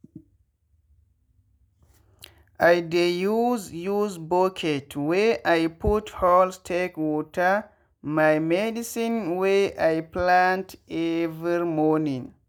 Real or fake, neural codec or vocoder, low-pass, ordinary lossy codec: real; none; none; none